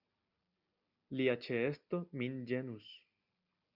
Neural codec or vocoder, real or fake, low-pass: none; real; 5.4 kHz